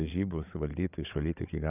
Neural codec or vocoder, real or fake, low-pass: codec, 16 kHz, 8 kbps, FreqCodec, larger model; fake; 3.6 kHz